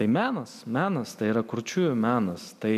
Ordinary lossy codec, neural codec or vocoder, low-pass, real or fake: MP3, 96 kbps; none; 14.4 kHz; real